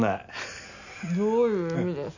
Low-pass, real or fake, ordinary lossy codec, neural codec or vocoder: 7.2 kHz; real; none; none